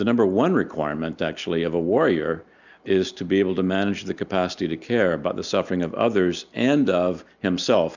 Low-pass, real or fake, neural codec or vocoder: 7.2 kHz; real; none